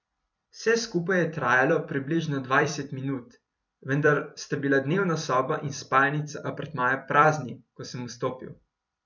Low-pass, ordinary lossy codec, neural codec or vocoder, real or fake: 7.2 kHz; none; vocoder, 44.1 kHz, 128 mel bands every 256 samples, BigVGAN v2; fake